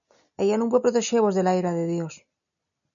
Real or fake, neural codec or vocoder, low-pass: real; none; 7.2 kHz